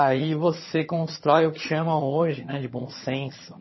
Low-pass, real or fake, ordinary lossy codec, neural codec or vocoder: 7.2 kHz; fake; MP3, 24 kbps; vocoder, 22.05 kHz, 80 mel bands, HiFi-GAN